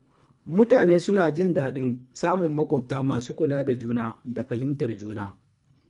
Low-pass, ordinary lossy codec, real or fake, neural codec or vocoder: 10.8 kHz; none; fake; codec, 24 kHz, 1.5 kbps, HILCodec